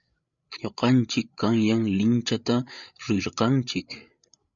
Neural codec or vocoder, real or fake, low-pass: codec, 16 kHz, 8 kbps, FreqCodec, larger model; fake; 7.2 kHz